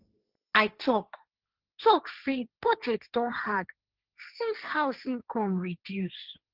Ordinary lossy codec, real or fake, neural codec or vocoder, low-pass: Opus, 24 kbps; fake; codec, 16 kHz, 1.1 kbps, Voila-Tokenizer; 5.4 kHz